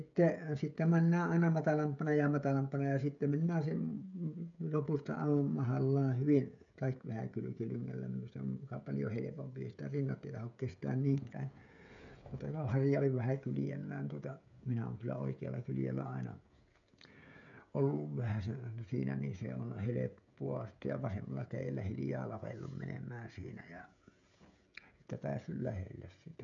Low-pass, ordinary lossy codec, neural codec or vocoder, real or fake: 7.2 kHz; MP3, 96 kbps; codec, 16 kHz, 16 kbps, FreqCodec, smaller model; fake